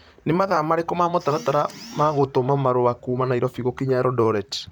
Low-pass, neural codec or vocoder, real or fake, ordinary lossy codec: none; vocoder, 44.1 kHz, 128 mel bands, Pupu-Vocoder; fake; none